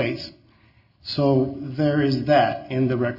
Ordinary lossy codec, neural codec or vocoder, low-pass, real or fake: AAC, 48 kbps; none; 5.4 kHz; real